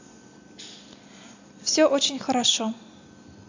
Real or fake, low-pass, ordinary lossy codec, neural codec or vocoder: real; 7.2 kHz; AAC, 48 kbps; none